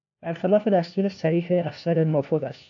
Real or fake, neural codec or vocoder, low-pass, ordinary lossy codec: fake; codec, 16 kHz, 1 kbps, FunCodec, trained on LibriTTS, 50 frames a second; 7.2 kHz; AAC, 64 kbps